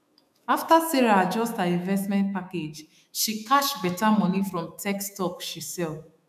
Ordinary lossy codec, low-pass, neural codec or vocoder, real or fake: none; 14.4 kHz; autoencoder, 48 kHz, 128 numbers a frame, DAC-VAE, trained on Japanese speech; fake